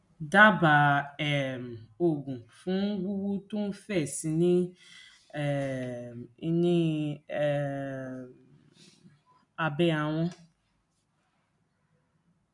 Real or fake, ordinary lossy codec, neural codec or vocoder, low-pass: real; none; none; 10.8 kHz